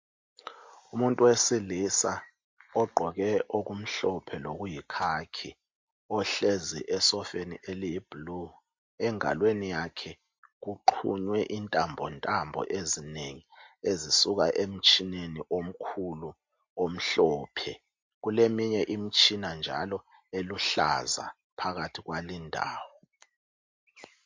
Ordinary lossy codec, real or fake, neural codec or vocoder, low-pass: MP3, 48 kbps; real; none; 7.2 kHz